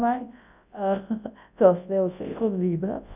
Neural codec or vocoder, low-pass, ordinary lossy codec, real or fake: codec, 24 kHz, 0.9 kbps, WavTokenizer, large speech release; 3.6 kHz; none; fake